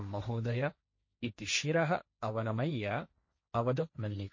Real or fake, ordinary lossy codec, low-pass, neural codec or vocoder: fake; MP3, 32 kbps; 7.2 kHz; codec, 16 kHz, 1.1 kbps, Voila-Tokenizer